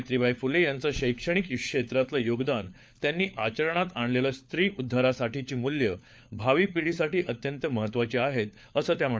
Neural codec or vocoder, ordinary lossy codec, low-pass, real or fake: codec, 16 kHz, 4 kbps, FunCodec, trained on LibriTTS, 50 frames a second; none; none; fake